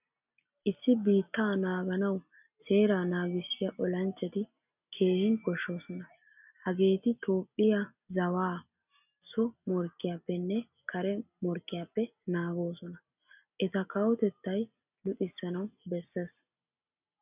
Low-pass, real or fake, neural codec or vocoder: 3.6 kHz; real; none